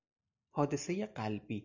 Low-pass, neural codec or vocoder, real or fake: 7.2 kHz; none; real